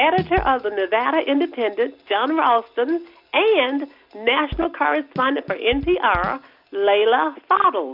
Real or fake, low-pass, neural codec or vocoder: real; 5.4 kHz; none